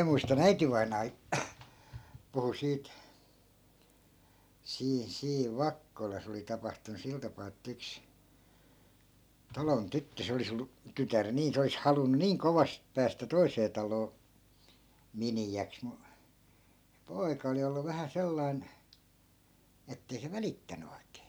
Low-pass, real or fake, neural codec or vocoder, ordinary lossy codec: none; real; none; none